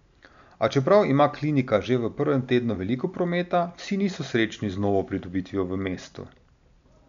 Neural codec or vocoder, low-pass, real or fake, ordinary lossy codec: none; 7.2 kHz; real; MP3, 64 kbps